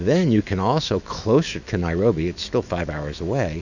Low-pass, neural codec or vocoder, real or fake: 7.2 kHz; autoencoder, 48 kHz, 128 numbers a frame, DAC-VAE, trained on Japanese speech; fake